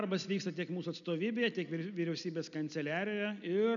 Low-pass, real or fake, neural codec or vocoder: 7.2 kHz; real; none